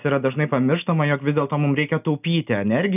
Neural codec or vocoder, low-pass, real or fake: none; 3.6 kHz; real